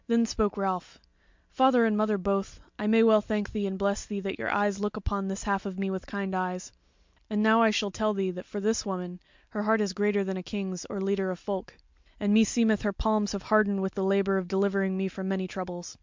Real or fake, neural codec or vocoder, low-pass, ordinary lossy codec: real; none; 7.2 kHz; MP3, 64 kbps